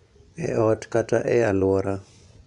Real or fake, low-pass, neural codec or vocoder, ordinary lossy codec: fake; 10.8 kHz; vocoder, 24 kHz, 100 mel bands, Vocos; none